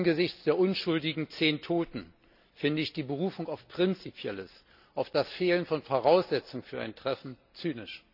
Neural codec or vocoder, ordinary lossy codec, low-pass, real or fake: none; none; 5.4 kHz; real